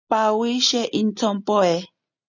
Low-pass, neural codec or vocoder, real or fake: 7.2 kHz; none; real